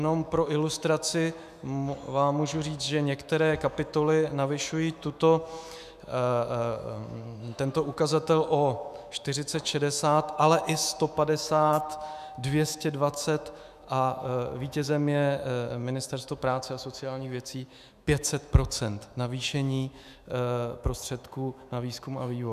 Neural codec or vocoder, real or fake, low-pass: autoencoder, 48 kHz, 128 numbers a frame, DAC-VAE, trained on Japanese speech; fake; 14.4 kHz